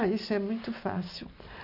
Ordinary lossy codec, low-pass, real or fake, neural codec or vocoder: none; 5.4 kHz; real; none